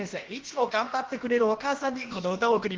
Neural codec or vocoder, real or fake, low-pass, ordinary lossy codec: codec, 16 kHz, about 1 kbps, DyCAST, with the encoder's durations; fake; 7.2 kHz; Opus, 16 kbps